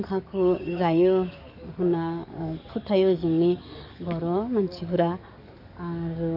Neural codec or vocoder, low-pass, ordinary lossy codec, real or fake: codec, 44.1 kHz, 7.8 kbps, DAC; 5.4 kHz; none; fake